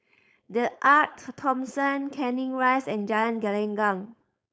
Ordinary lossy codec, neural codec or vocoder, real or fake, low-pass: none; codec, 16 kHz, 4.8 kbps, FACodec; fake; none